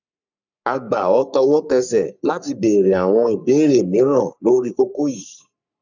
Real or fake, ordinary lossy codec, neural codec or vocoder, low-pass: fake; none; codec, 44.1 kHz, 3.4 kbps, Pupu-Codec; 7.2 kHz